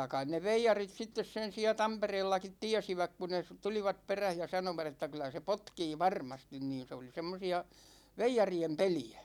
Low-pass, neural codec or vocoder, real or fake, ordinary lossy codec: 19.8 kHz; none; real; none